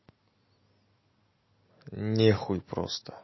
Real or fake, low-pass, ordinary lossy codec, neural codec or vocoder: real; 7.2 kHz; MP3, 24 kbps; none